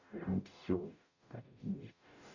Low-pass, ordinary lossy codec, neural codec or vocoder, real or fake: 7.2 kHz; none; codec, 44.1 kHz, 0.9 kbps, DAC; fake